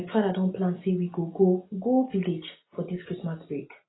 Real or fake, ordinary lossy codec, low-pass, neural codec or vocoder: real; AAC, 16 kbps; 7.2 kHz; none